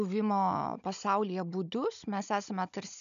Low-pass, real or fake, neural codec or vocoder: 7.2 kHz; fake; codec, 16 kHz, 16 kbps, FunCodec, trained on Chinese and English, 50 frames a second